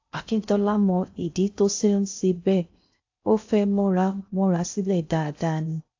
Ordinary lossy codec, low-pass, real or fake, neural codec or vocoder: AAC, 48 kbps; 7.2 kHz; fake; codec, 16 kHz in and 24 kHz out, 0.6 kbps, FocalCodec, streaming, 4096 codes